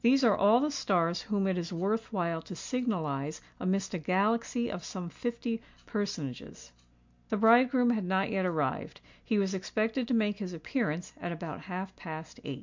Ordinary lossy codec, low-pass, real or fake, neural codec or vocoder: MP3, 64 kbps; 7.2 kHz; real; none